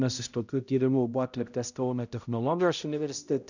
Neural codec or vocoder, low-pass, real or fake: codec, 16 kHz, 0.5 kbps, X-Codec, HuBERT features, trained on balanced general audio; 7.2 kHz; fake